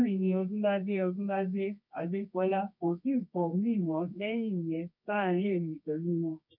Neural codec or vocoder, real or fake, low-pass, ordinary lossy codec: codec, 24 kHz, 0.9 kbps, WavTokenizer, medium music audio release; fake; 5.4 kHz; none